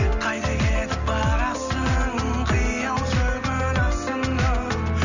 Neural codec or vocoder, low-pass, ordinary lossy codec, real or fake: none; 7.2 kHz; none; real